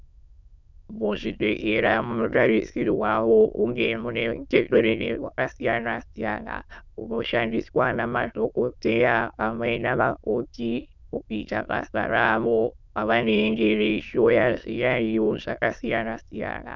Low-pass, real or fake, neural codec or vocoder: 7.2 kHz; fake; autoencoder, 22.05 kHz, a latent of 192 numbers a frame, VITS, trained on many speakers